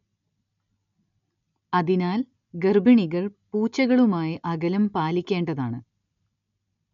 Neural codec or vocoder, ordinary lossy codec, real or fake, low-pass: none; MP3, 96 kbps; real; 7.2 kHz